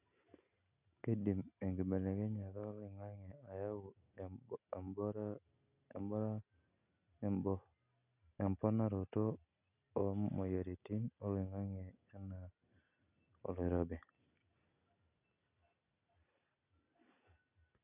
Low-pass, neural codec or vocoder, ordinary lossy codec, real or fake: 3.6 kHz; none; none; real